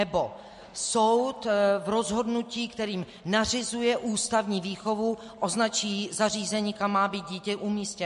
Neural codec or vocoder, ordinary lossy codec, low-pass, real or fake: none; MP3, 48 kbps; 14.4 kHz; real